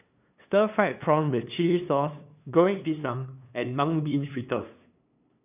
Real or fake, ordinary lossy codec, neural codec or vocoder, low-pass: fake; none; codec, 16 kHz, 2 kbps, FunCodec, trained on LibriTTS, 25 frames a second; 3.6 kHz